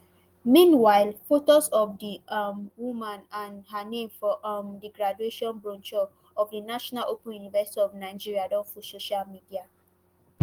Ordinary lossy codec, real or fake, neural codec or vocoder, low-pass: Opus, 24 kbps; real; none; 19.8 kHz